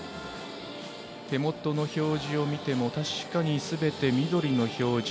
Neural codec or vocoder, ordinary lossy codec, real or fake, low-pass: none; none; real; none